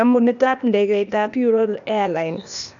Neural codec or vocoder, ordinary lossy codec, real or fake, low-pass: codec, 16 kHz, 0.8 kbps, ZipCodec; none; fake; 7.2 kHz